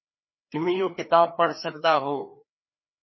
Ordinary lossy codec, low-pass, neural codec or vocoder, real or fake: MP3, 24 kbps; 7.2 kHz; codec, 16 kHz, 2 kbps, FreqCodec, larger model; fake